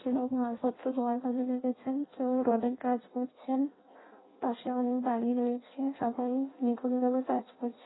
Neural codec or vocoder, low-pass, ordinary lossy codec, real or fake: codec, 16 kHz in and 24 kHz out, 0.6 kbps, FireRedTTS-2 codec; 7.2 kHz; AAC, 16 kbps; fake